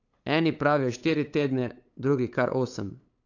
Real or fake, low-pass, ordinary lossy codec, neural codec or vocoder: fake; 7.2 kHz; AAC, 48 kbps; codec, 16 kHz, 8 kbps, FunCodec, trained on LibriTTS, 25 frames a second